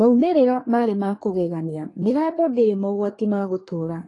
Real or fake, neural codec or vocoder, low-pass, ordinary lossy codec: fake; codec, 24 kHz, 1 kbps, SNAC; 10.8 kHz; AAC, 32 kbps